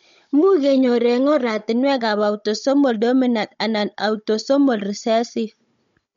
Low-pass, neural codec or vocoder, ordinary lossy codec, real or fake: 7.2 kHz; codec, 16 kHz, 16 kbps, FunCodec, trained on Chinese and English, 50 frames a second; MP3, 48 kbps; fake